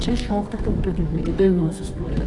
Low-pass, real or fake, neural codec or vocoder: 10.8 kHz; fake; codec, 24 kHz, 0.9 kbps, WavTokenizer, medium music audio release